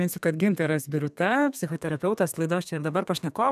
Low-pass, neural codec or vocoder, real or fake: 14.4 kHz; codec, 32 kHz, 1.9 kbps, SNAC; fake